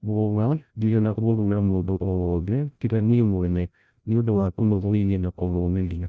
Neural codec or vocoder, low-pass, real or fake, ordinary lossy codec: codec, 16 kHz, 0.5 kbps, FreqCodec, larger model; none; fake; none